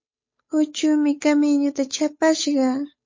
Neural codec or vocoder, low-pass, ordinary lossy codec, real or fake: codec, 16 kHz, 8 kbps, FunCodec, trained on Chinese and English, 25 frames a second; 7.2 kHz; MP3, 32 kbps; fake